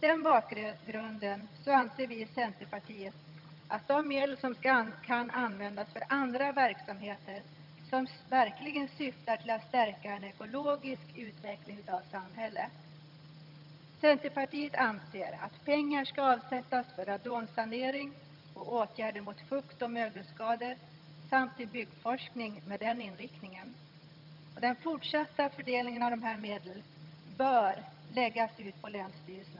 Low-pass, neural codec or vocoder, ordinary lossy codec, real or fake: 5.4 kHz; vocoder, 22.05 kHz, 80 mel bands, HiFi-GAN; none; fake